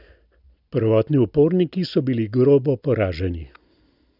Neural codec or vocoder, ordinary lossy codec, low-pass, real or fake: none; none; 5.4 kHz; real